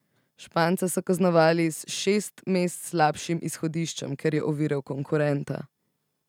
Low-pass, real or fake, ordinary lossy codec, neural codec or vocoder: 19.8 kHz; real; none; none